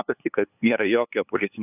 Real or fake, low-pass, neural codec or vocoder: fake; 3.6 kHz; codec, 16 kHz, 2 kbps, FunCodec, trained on LibriTTS, 25 frames a second